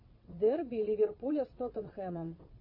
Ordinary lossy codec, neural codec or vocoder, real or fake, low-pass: MP3, 32 kbps; vocoder, 22.05 kHz, 80 mel bands, WaveNeXt; fake; 5.4 kHz